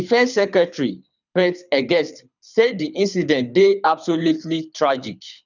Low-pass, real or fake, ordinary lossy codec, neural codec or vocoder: 7.2 kHz; fake; none; codec, 24 kHz, 6 kbps, HILCodec